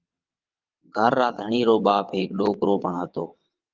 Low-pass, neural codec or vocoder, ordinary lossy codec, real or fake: 7.2 kHz; vocoder, 22.05 kHz, 80 mel bands, WaveNeXt; Opus, 24 kbps; fake